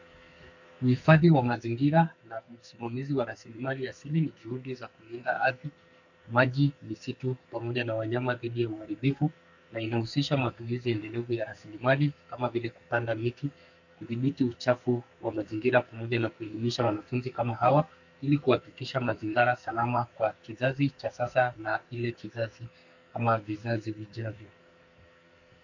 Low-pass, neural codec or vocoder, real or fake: 7.2 kHz; codec, 44.1 kHz, 2.6 kbps, SNAC; fake